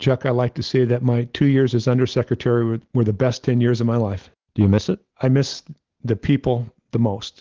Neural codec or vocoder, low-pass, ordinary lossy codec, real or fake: none; 7.2 kHz; Opus, 16 kbps; real